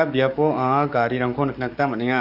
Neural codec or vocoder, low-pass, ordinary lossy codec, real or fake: codec, 44.1 kHz, 7.8 kbps, DAC; 5.4 kHz; Opus, 64 kbps; fake